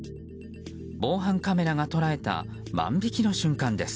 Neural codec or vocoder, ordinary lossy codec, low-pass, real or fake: none; none; none; real